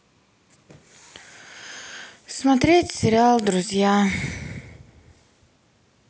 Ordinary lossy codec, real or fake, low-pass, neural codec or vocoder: none; real; none; none